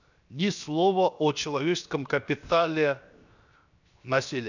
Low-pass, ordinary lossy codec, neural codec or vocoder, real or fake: 7.2 kHz; none; codec, 16 kHz, 0.7 kbps, FocalCodec; fake